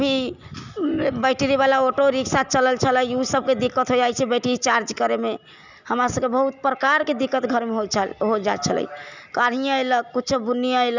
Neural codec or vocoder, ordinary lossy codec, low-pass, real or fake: none; none; 7.2 kHz; real